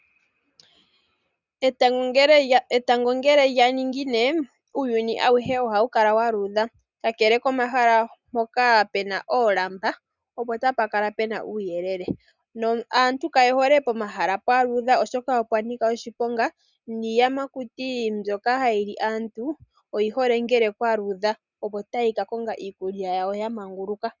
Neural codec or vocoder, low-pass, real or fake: none; 7.2 kHz; real